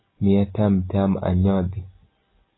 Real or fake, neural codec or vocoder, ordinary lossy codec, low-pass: real; none; AAC, 16 kbps; 7.2 kHz